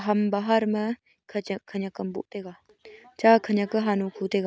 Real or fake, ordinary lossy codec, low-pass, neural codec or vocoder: real; none; none; none